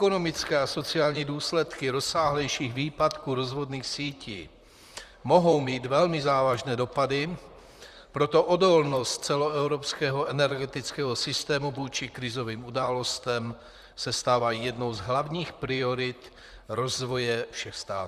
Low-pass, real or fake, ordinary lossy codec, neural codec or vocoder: 14.4 kHz; fake; Opus, 64 kbps; vocoder, 44.1 kHz, 128 mel bands, Pupu-Vocoder